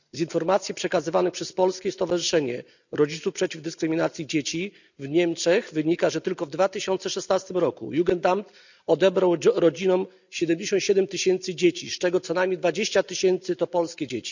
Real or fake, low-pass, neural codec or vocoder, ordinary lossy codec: real; 7.2 kHz; none; none